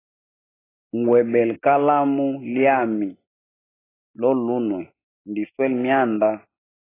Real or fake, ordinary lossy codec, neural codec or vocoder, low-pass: real; AAC, 16 kbps; none; 3.6 kHz